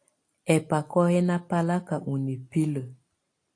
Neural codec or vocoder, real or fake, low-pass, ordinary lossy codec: none; real; 9.9 kHz; AAC, 48 kbps